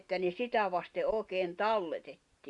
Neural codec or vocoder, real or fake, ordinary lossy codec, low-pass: none; real; Opus, 64 kbps; 10.8 kHz